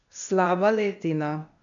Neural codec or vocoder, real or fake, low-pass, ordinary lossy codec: codec, 16 kHz, 0.8 kbps, ZipCodec; fake; 7.2 kHz; none